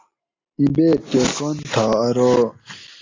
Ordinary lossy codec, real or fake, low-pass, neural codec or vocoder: AAC, 32 kbps; real; 7.2 kHz; none